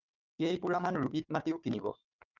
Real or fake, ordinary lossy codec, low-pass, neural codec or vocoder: fake; Opus, 24 kbps; 7.2 kHz; codec, 16 kHz, 4 kbps, FunCodec, trained on Chinese and English, 50 frames a second